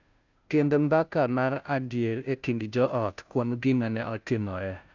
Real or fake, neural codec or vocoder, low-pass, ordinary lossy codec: fake; codec, 16 kHz, 0.5 kbps, FunCodec, trained on Chinese and English, 25 frames a second; 7.2 kHz; none